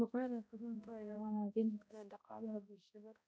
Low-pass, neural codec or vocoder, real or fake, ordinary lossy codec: none; codec, 16 kHz, 0.5 kbps, X-Codec, HuBERT features, trained on balanced general audio; fake; none